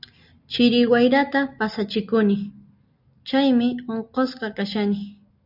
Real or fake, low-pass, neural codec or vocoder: real; 5.4 kHz; none